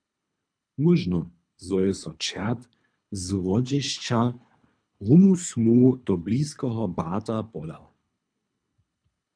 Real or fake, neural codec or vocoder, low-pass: fake; codec, 24 kHz, 3 kbps, HILCodec; 9.9 kHz